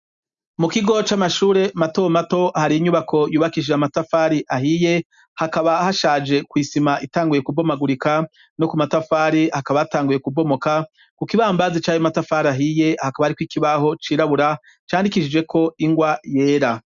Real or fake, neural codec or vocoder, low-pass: real; none; 7.2 kHz